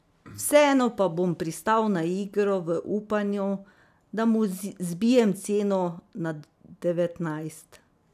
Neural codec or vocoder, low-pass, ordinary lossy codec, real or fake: none; 14.4 kHz; none; real